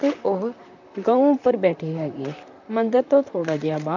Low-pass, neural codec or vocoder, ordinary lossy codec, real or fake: 7.2 kHz; vocoder, 44.1 kHz, 128 mel bands, Pupu-Vocoder; none; fake